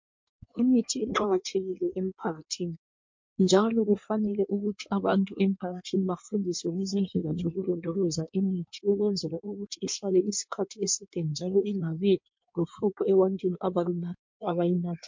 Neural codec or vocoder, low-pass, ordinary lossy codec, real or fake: codec, 16 kHz in and 24 kHz out, 1.1 kbps, FireRedTTS-2 codec; 7.2 kHz; MP3, 48 kbps; fake